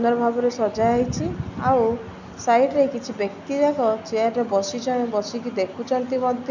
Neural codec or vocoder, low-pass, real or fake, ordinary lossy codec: none; 7.2 kHz; real; none